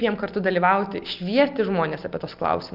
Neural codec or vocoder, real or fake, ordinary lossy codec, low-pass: none; real; Opus, 24 kbps; 5.4 kHz